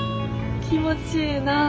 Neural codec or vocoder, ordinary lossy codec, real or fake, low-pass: none; none; real; none